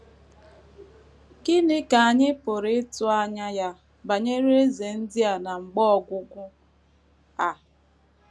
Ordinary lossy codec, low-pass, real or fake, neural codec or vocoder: none; none; real; none